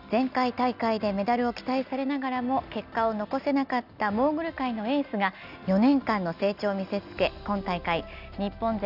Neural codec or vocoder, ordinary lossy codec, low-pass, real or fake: none; none; 5.4 kHz; real